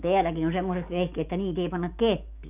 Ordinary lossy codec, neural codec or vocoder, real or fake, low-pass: none; codec, 44.1 kHz, 7.8 kbps, DAC; fake; 3.6 kHz